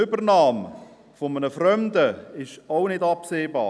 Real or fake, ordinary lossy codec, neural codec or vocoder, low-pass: real; none; none; none